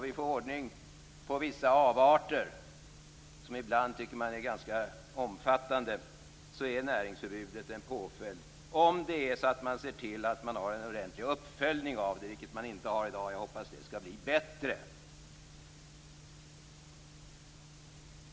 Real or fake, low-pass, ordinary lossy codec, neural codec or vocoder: real; none; none; none